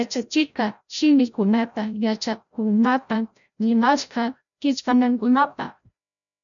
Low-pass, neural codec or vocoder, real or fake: 7.2 kHz; codec, 16 kHz, 0.5 kbps, FreqCodec, larger model; fake